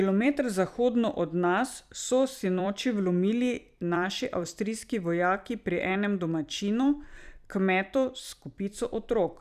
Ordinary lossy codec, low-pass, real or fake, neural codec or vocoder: none; 14.4 kHz; fake; vocoder, 44.1 kHz, 128 mel bands every 256 samples, BigVGAN v2